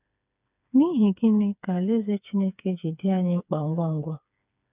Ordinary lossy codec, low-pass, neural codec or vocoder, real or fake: none; 3.6 kHz; codec, 16 kHz, 4 kbps, FreqCodec, smaller model; fake